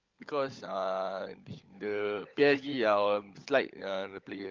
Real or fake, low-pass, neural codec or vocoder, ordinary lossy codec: fake; 7.2 kHz; codec, 16 kHz, 16 kbps, FunCodec, trained on LibriTTS, 50 frames a second; Opus, 24 kbps